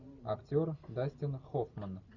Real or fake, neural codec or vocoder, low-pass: real; none; 7.2 kHz